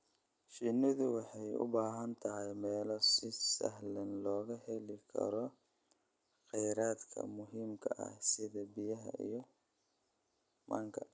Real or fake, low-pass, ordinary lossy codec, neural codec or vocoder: real; none; none; none